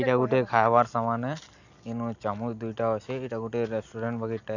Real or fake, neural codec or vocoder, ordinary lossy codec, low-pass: real; none; none; 7.2 kHz